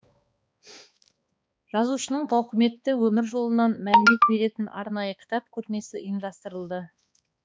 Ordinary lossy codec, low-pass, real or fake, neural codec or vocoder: none; none; fake; codec, 16 kHz, 2 kbps, X-Codec, HuBERT features, trained on balanced general audio